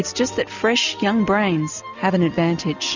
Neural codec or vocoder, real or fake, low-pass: none; real; 7.2 kHz